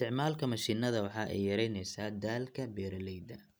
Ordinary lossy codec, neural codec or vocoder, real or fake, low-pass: none; none; real; none